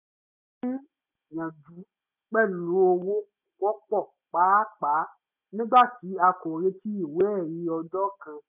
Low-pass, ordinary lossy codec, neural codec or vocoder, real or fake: 3.6 kHz; none; none; real